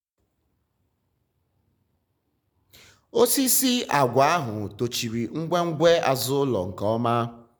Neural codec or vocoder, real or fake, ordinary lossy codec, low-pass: none; real; none; none